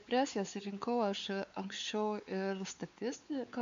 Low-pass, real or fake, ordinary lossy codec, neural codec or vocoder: 7.2 kHz; fake; MP3, 64 kbps; codec, 16 kHz, 4 kbps, X-Codec, WavLM features, trained on Multilingual LibriSpeech